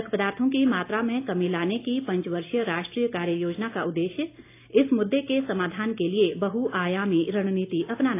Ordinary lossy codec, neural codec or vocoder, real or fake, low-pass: AAC, 24 kbps; none; real; 3.6 kHz